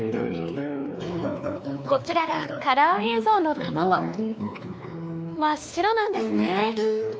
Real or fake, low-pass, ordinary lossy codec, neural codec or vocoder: fake; none; none; codec, 16 kHz, 2 kbps, X-Codec, WavLM features, trained on Multilingual LibriSpeech